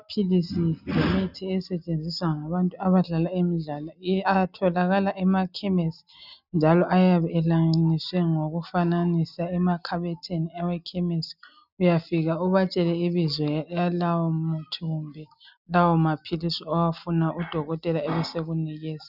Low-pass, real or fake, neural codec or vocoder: 5.4 kHz; real; none